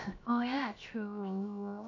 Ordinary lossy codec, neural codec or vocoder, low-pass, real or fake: none; codec, 16 kHz, 0.7 kbps, FocalCodec; 7.2 kHz; fake